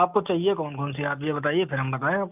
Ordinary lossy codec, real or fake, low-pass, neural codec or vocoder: none; real; 3.6 kHz; none